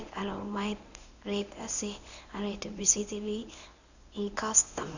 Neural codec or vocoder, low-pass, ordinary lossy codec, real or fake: codec, 24 kHz, 0.9 kbps, WavTokenizer, medium speech release version 1; 7.2 kHz; none; fake